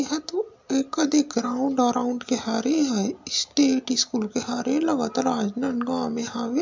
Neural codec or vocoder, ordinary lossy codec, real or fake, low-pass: none; MP3, 64 kbps; real; 7.2 kHz